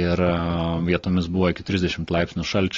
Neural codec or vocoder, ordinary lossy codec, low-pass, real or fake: none; AAC, 32 kbps; 7.2 kHz; real